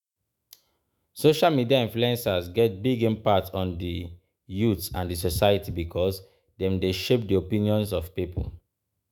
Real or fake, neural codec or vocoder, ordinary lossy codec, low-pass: fake; autoencoder, 48 kHz, 128 numbers a frame, DAC-VAE, trained on Japanese speech; none; none